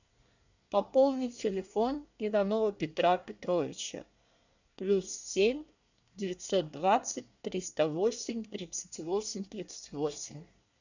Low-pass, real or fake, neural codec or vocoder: 7.2 kHz; fake; codec, 24 kHz, 1 kbps, SNAC